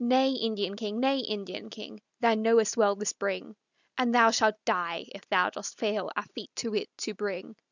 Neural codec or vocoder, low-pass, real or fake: none; 7.2 kHz; real